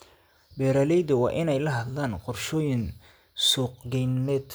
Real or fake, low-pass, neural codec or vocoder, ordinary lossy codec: real; none; none; none